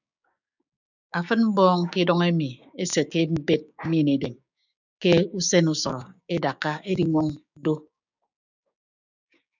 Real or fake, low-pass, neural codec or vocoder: fake; 7.2 kHz; codec, 16 kHz, 6 kbps, DAC